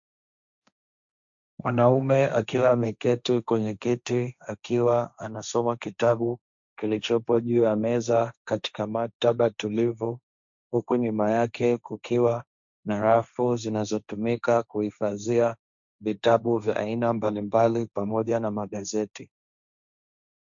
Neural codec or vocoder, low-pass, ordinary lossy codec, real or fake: codec, 16 kHz, 1.1 kbps, Voila-Tokenizer; 7.2 kHz; MP3, 64 kbps; fake